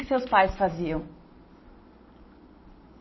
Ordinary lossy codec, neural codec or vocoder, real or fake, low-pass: MP3, 24 kbps; none; real; 7.2 kHz